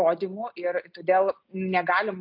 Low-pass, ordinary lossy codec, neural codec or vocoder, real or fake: 5.4 kHz; AAC, 48 kbps; none; real